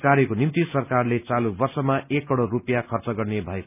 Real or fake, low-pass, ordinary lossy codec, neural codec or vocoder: real; 3.6 kHz; none; none